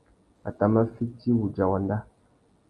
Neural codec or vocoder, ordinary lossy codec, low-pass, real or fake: none; Opus, 32 kbps; 10.8 kHz; real